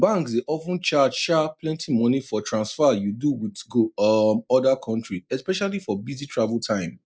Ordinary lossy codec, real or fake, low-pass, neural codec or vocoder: none; real; none; none